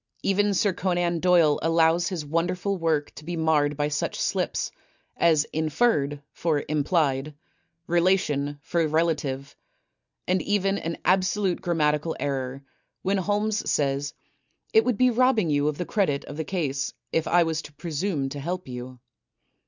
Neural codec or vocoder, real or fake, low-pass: none; real; 7.2 kHz